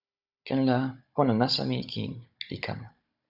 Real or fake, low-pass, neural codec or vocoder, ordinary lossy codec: fake; 5.4 kHz; codec, 16 kHz, 16 kbps, FunCodec, trained on Chinese and English, 50 frames a second; Opus, 64 kbps